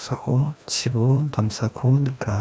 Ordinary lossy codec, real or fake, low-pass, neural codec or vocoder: none; fake; none; codec, 16 kHz, 1 kbps, FreqCodec, larger model